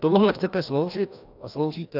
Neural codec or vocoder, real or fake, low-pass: codec, 16 kHz, 1 kbps, FreqCodec, larger model; fake; 5.4 kHz